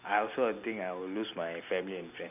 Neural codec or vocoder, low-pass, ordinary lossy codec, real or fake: none; 3.6 kHz; none; real